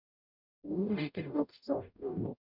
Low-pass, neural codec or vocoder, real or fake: 5.4 kHz; codec, 44.1 kHz, 0.9 kbps, DAC; fake